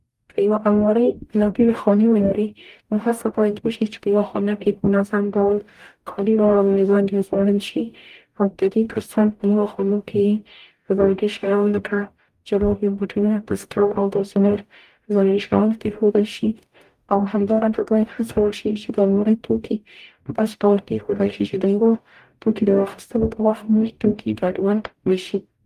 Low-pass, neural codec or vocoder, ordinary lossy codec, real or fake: 14.4 kHz; codec, 44.1 kHz, 0.9 kbps, DAC; Opus, 32 kbps; fake